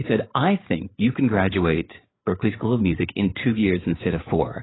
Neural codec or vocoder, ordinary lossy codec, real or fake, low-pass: codec, 16 kHz, 8 kbps, FreqCodec, larger model; AAC, 16 kbps; fake; 7.2 kHz